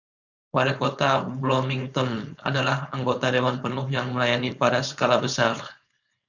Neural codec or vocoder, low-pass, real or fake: codec, 16 kHz, 4.8 kbps, FACodec; 7.2 kHz; fake